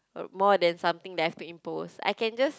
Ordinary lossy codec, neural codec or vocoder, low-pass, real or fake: none; none; none; real